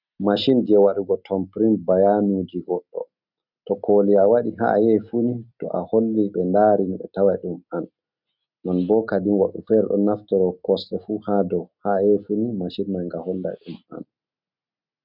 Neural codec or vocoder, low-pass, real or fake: none; 5.4 kHz; real